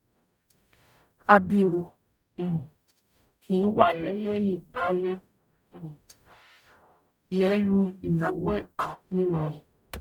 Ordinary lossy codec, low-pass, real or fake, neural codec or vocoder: none; 19.8 kHz; fake; codec, 44.1 kHz, 0.9 kbps, DAC